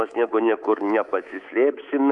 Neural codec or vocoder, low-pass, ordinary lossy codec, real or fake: codec, 24 kHz, 3.1 kbps, DualCodec; 10.8 kHz; Opus, 64 kbps; fake